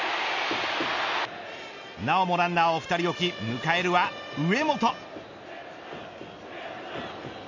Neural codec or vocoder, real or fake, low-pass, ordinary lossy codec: none; real; 7.2 kHz; none